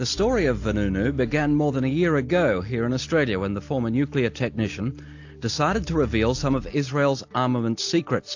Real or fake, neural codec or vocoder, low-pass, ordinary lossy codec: real; none; 7.2 kHz; AAC, 48 kbps